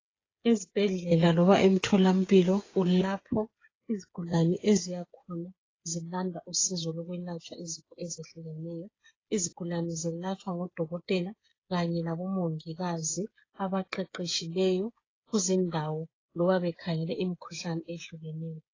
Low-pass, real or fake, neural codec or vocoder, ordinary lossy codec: 7.2 kHz; fake; codec, 16 kHz, 8 kbps, FreqCodec, smaller model; AAC, 32 kbps